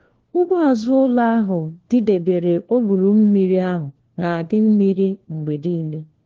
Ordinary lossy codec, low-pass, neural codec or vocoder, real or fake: Opus, 16 kbps; 7.2 kHz; codec, 16 kHz, 1 kbps, FunCodec, trained on LibriTTS, 50 frames a second; fake